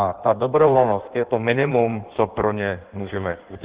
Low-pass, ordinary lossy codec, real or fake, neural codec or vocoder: 3.6 kHz; Opus, 16 kbps; fake; codec, 16 kHz in and 24 kHz out, 1.1 kbps, FireRedTTS-2 codec